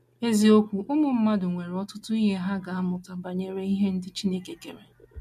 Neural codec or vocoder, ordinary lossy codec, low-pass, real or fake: none; MP3, 64 kbps; 14.4 kHz; real